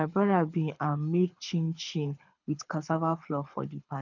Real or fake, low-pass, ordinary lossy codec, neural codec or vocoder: fake; 7.2 kHz; none; codec, 24 kHz, 6 kbps, HILCodec